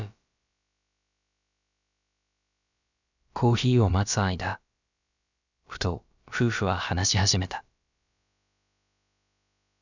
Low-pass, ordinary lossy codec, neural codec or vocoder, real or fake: 7.2 kHz; none; codec, 16 kHz, about 1 kbps, DyCAST, with the encoder's durations; fake